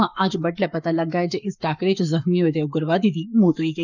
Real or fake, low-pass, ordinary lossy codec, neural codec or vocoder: fake; 7.2 kHz; none; codec, 44.1 kHz, 7.8 kbps, Pupu-Codec